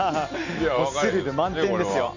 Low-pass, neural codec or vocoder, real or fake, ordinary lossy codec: 7.2 kHz; none; real; none